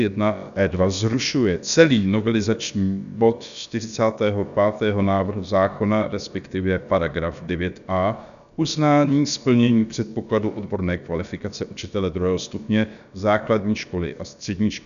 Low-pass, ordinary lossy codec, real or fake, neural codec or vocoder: 7.2 kHz; MP3, 96 kbps; fake; codec, 16 kHz, about 1 kbps, DyCAST, with the encoder's durations